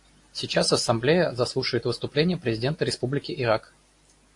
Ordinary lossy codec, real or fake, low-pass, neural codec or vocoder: AAC, 48 kbps; real; 10.8 kHz; none